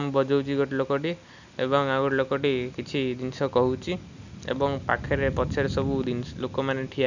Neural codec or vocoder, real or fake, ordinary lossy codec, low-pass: none; real; none; 7.2 kHz